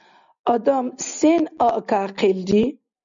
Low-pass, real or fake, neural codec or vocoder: 7.2 kHz; real; none